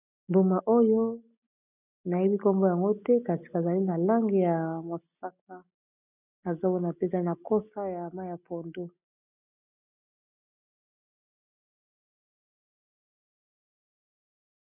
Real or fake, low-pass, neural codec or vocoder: real; 3.6 kHz; none